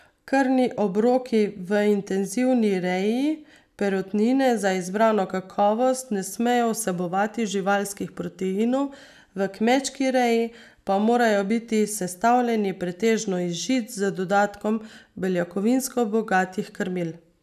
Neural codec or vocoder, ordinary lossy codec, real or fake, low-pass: none; none; real; 14.4 kHz